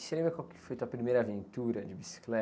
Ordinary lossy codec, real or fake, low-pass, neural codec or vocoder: none; real; none; none